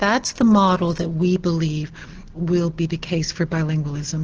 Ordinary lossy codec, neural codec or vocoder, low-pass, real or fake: Opus, 16 kbps; none; 7.2 kHz; real